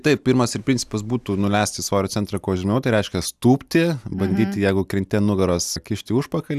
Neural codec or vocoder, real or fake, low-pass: none; real; 14.4 kHz